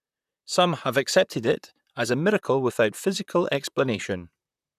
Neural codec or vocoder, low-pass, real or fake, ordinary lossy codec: vocoder, 44.1 kHz, 128 mel bands, Pupu-Vocoder; 14.4 kHz; fake; none